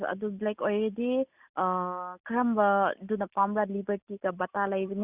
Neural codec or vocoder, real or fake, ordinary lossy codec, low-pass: none; real; Opus, 64 kbps; 3.6 kHz